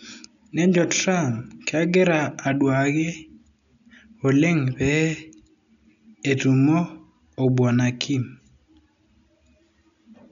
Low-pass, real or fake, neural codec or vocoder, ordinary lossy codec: 7.2 kHz; real; none; none